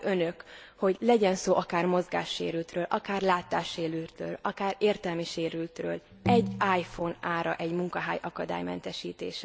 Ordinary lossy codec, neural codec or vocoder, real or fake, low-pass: none; none; real; none